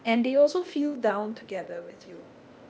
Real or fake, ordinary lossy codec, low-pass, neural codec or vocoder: fake; none; none; codec, 16 kHz, 0.8 kbps, ZipCodec